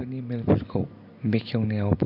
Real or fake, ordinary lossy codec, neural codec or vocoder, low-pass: real; none; none; 5.4 kHz